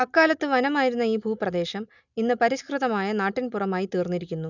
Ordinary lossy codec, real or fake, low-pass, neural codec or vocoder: none; real; 7.2 kHz; none